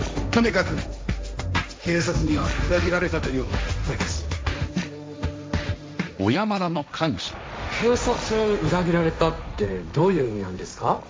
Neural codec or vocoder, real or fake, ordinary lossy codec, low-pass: codec, 16 kHz, 1.1 kbps, Voila-Tokenizer; fake; none; none